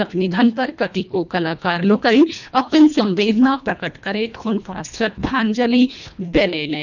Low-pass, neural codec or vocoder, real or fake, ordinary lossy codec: 7.2 kHz; codec, 24 kHz, 1.5 kbps, HILCodec; fake; none